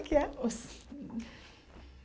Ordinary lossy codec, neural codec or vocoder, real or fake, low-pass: none; none; real; none